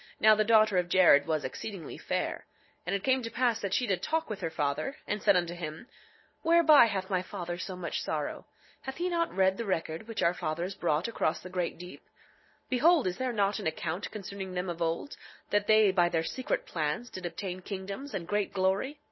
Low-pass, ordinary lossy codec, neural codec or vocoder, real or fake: 7.2 kHz; MP3, 24 kbps; none; real